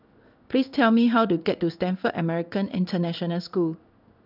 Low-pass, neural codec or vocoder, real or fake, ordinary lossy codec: 5.4 kHz; codec, 16 kHz in and 24 kHz out, 1 kbps, XY-Tokenizer; fake; none